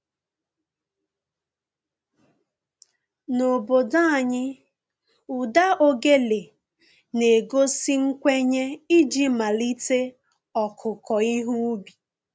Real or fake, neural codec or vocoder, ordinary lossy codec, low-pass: real; none; none; none